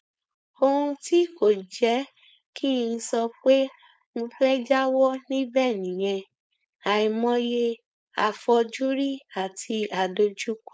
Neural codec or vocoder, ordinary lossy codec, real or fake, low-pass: codec, 16 kHz, 4.8 kbps, FACodec; none; fake; none